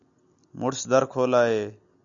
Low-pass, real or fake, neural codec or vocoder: 7.2 kHz; real; none